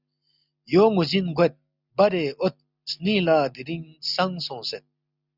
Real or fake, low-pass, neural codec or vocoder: real; 5.4 kHz; none